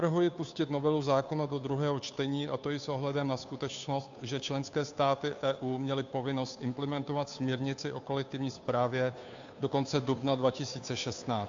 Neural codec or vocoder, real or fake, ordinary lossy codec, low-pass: codec, 16 kHz, 2 kbps, FunCodec, trained on Chinese and English, 25 frames a second; fake; AAC, 64 kbps; 7.2 kHz